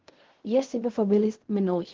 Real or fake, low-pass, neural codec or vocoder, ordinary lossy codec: fake; 7.2 kHz; codec, 16 kHz in and 24 kHz out, 0.4 kbps, LongCat-Audio-Codec, fine tuned four codebook decoder; Opus, 16 kbps